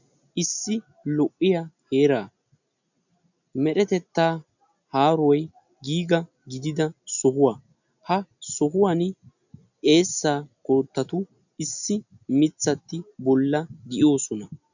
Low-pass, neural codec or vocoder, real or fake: 7.2 kHz; none; real